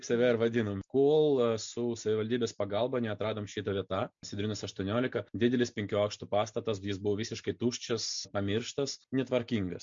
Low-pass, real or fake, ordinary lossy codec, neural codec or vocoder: 7.2 kHz; real; MP3, 48 kbps; none